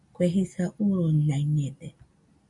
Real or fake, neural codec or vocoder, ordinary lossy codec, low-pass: real; none; MP3, 64 kbps; 10.8 kHz